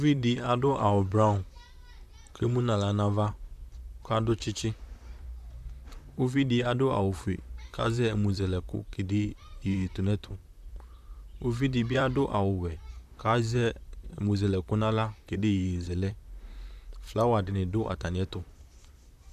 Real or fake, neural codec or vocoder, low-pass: fake; vocoder, 44.1 kHz, 128 mel bands, Pupu-Vocoder; 14.4 kHz